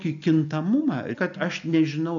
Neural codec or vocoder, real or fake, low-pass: none; real; 7.2 kHz